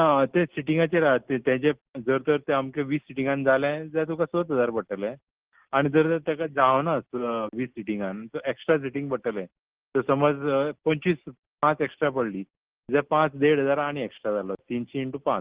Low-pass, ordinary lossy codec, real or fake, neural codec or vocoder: 3.6 kHz; Opus, 16 kbps; real; none